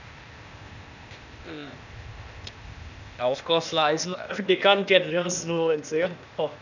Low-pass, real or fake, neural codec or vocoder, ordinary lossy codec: 7.2 kHz; fake; codec, 16 kHz, 0.8 kbps, ZipCodec; none